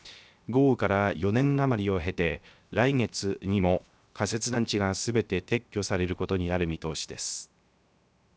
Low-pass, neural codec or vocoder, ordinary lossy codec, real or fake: none; codec, 16 kHz, 0.7 kbps, FocalCodec; none; fake